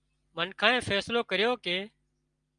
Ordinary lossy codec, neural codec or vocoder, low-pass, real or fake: Opus, 32 kbps; none; 9.9 kHz; real